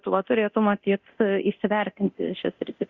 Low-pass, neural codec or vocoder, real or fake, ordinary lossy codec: 7.2 kHz; codec, 24 kHz, 0.9 kbps, DualCodec; fake; Opus, 64 kbps